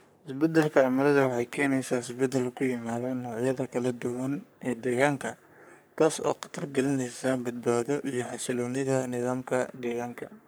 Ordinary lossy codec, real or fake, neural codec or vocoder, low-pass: none; fake; codec, 44.1 kHz, 3.4 kbps, Pupu-Codec; none